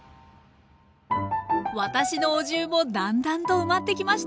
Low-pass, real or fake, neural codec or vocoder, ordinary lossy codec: none; real; none; none